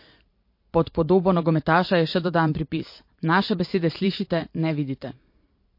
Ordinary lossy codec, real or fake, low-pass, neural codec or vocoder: MP3, 32 kbps; fake; 5.4 kHz; vocoder, 44.1 kHz, 128 mel bands every 512 samples, BigVGAN v2